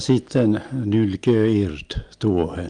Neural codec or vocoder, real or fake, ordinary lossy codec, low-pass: none; real; Opus, 32 kbps; 9.9 kHz